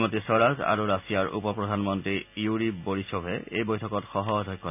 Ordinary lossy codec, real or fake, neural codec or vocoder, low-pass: none; real; none; 3.6 kHz